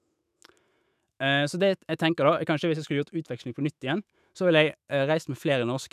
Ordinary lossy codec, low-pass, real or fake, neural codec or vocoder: none; 14.4 kHz; fake; autoencoder, 48 kHz, 128 numbers a frame, DAC-VAE, trained on Japanese speech